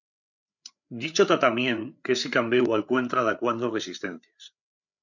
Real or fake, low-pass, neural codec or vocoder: fake; 7.2 kHz; codec, 16 kHz, 8 kbps, FreqCodec, larger model